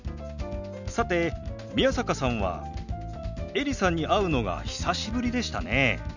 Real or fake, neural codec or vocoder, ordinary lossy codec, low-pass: real; none; none; 7.2 kHz